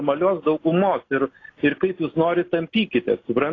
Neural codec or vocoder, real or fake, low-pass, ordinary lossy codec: none; real; 7.2 kHz; AAC, 32 kbps